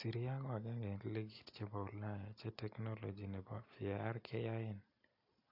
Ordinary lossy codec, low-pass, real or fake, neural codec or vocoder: AAC, 48 kbps; 5.4 kHz; real; none